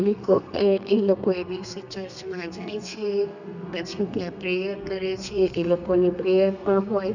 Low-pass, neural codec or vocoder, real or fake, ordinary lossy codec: 7.2 kHz; codec, 32 kHz, 1.9 kbps, SNAC; fake; none